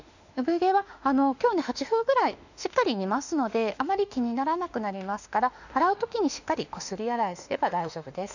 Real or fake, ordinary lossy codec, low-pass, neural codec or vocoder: fake; none; 7.2 kHz; autoencoder, 48 kHz, 32 numbers a frame, DAC-VAE, trained on Japanese speech